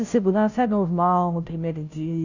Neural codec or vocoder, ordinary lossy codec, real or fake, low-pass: codec, 16 kHz, 0.5 kbps, FunCodec, trained on Chinese and English, 25 frames a second; none; fake; 7.2 kHz